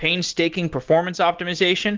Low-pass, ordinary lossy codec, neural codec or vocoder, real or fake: 7.2 kHz; Opus, 16 kbps; none; real